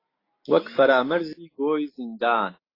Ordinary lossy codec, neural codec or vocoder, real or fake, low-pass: AAC, 24 kbps; none; real; 5.4 kHz